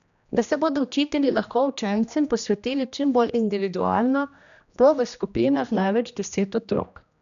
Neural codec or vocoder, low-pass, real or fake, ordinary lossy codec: codec, 16 kHz, 1 kbps, X-Codec, HuBERT features, trained on general audio; 7.2 kHz; fake; none